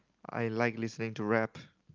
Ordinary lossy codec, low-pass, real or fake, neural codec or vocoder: Opus, 32 kbps; 7.2 kHz; real; none